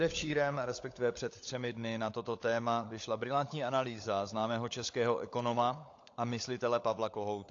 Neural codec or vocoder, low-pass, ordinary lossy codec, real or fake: codec, 16 kHz, 16 kbps, FunCodec, trained on LibriTTS, 50 frames a second; 7.2 kHz; AAC, 48 kbps; fake